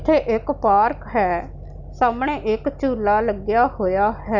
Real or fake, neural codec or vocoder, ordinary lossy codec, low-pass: real; none; none; 7.2 kHz